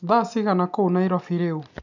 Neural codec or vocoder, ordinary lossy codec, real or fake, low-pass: none; none; real; 7.2 kHz